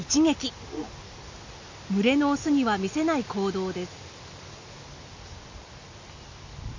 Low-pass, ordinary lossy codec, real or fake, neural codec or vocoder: 7.2 kHz; MP3, 48 kbps; real; none